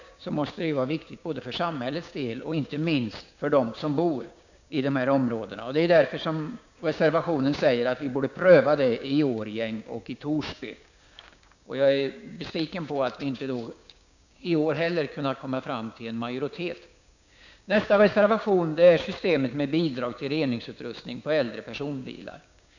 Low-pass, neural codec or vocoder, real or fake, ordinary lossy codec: 7.2 kHz; codec, 16 kHz, 6 kbps, DAC; fake; none